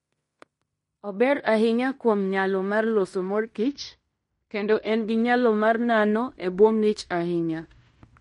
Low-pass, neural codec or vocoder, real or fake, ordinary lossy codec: 10.8 kHz; codec, 16 kHz in and 24 kHz out, 0.9 kbps, LongCat-Audio-Codec, fine tuned four codebook decoder; fake; MP3, 48 kbps